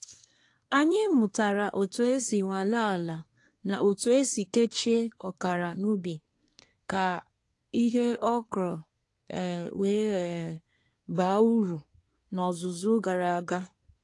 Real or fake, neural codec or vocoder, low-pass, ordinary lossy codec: fake; codec, 24 kHz, 1 kbps, SNAC; 10.8 kHz; AAC, 48 kbps